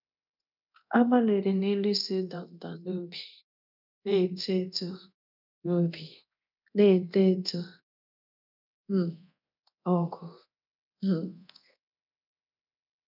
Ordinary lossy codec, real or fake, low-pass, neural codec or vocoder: none; fake; 5.4 kHz; codec, 24 kHz, 0.9 kbps, DualCodec